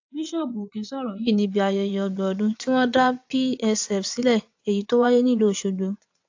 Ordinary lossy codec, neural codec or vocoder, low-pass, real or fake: AAC, 48 kbps; vocoder, 22.05 kHz, 80 mel bands, WaveNeXt; 7.2 kHz; fake